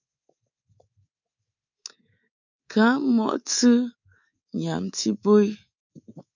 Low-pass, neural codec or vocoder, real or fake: 7.2 kHz; codec, 24 kHz, 3.1 kbps, DualCodec; fake